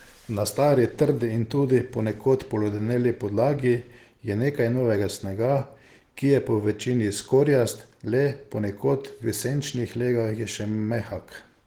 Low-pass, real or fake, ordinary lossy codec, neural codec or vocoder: 19.8 kHz; real; Opus, 16 kbps; none